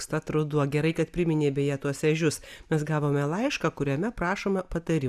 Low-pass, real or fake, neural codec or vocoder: 14.4 kHz; real; none